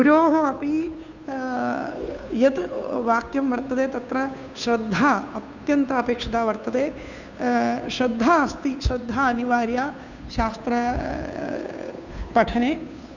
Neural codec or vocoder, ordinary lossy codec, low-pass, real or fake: codec, 16 kHz, 2 kbps, FunCodec, trained on Chinese and English, 25 frames a second; none; 7.2 kHz; fake